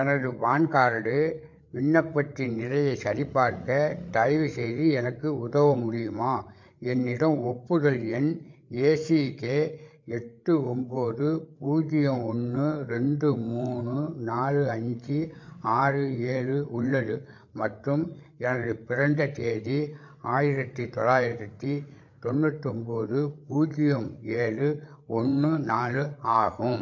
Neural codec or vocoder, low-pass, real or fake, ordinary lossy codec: codec, 16 kHz, 8 kbps, FreqCodec, larger model; 7.2 kHz; fake; MP3, 48 kbps